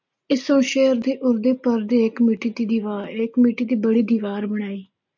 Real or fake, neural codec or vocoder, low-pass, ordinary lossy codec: real; none; 7.2 kHz; MP3, 48 kbps